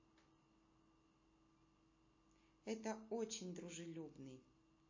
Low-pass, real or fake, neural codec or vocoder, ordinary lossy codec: 7.2 kHz; real; none; MP3, 32 kbps